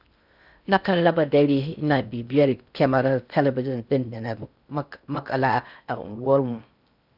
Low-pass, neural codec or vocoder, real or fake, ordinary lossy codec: 5.4 kHz; codec, 16 kHz in and 24 kHz out, 0.6 kbps, FocalCodec, streaming, 4096 codes; fake; none